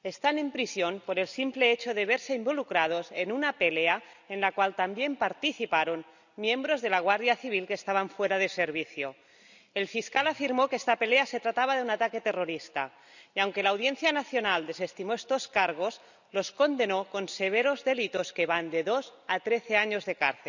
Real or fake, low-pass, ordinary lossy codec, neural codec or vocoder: real; 7.2 kHz; none; none